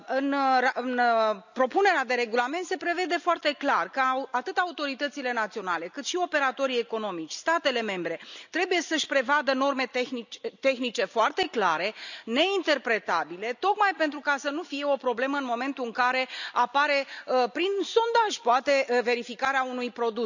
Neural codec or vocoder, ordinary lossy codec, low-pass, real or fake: none; none; 7.2 kHz; real